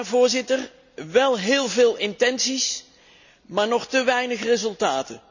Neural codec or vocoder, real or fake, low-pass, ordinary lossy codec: none; real; 7.2 kHz; none